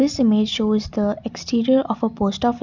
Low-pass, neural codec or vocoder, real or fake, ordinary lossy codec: 7.2 kHz; none; real; none